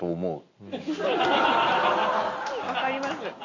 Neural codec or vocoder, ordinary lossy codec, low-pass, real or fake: none; none; 7.2 kHz; real